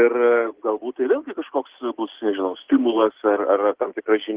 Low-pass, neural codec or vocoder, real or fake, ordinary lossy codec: 3.6 kHz; none; real; Opus, 16 kbps